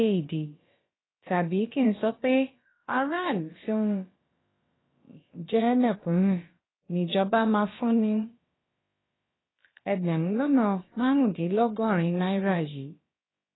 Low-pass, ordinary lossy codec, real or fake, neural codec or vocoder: 7.2 kHz; AAC, 16 kbps; fake; codec, 16 kHz, about 1 kbps, DyCAST, with the encoder's durations